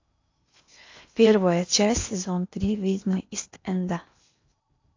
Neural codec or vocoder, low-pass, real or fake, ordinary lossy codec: codec, 16 kHz in and 24 kHz out, 0.8 kbps, FocalCodec, streaming, 65536 codes; 7.2 kHz; fake; AAC, 48 kbps